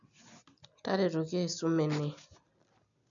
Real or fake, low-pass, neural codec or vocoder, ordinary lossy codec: real; 7.2 kHz; none; none